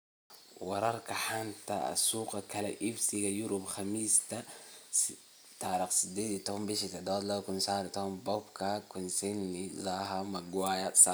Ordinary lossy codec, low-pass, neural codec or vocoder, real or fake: none; none; none; real